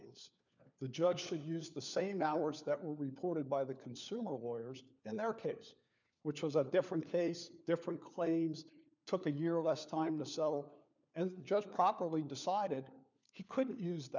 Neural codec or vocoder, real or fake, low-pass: codec, 16 kHz, 4 kbps, FunCodec, trained on LibriTTS, 50 frames a second; fake; 7.2 kHz